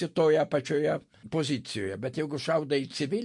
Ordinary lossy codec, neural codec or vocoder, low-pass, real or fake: MP3, 48 kbps; none; 10.8 kHz; real